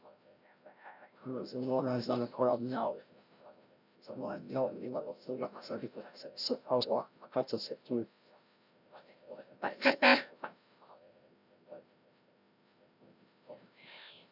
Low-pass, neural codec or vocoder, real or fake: 5.4 kHz; codec, 16 kHz, 0.5 kbps, FreqCodec, larger model; fake